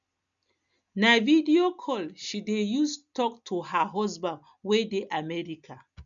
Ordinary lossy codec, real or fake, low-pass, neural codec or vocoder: none; real; 7.2 kHz; none